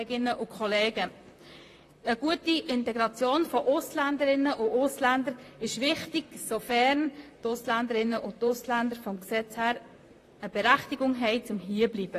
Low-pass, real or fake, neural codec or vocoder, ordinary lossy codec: 14.4 kHz; fake; vocoder, 44.1 kHz, 128 mel bands, Pupu-Vocoder; AAC, 48 kbps